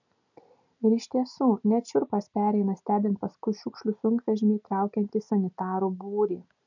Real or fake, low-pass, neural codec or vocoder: real; 7.2 kHz; none